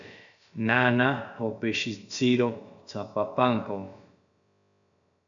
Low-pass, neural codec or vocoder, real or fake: 7.2 kHz; codec, 16 kHz, about 1 kbps, DyCAST, with the encoder's durations; fake